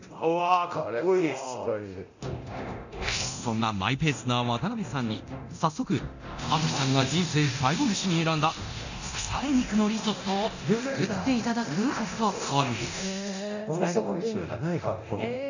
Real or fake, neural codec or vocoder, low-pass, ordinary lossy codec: fake; codec, 24 kHz, 0.9 kbps, DualCodec; 7.2 kHz; none